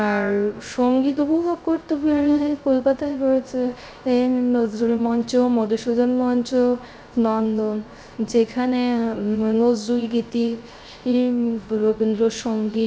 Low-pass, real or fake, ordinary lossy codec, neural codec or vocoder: none; fake; none; codec, 16 kHz, 0.3 kbps, FocalCodec